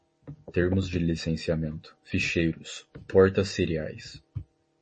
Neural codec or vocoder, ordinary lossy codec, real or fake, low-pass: none; MP3, 32 kbps; real; 10.8 kHz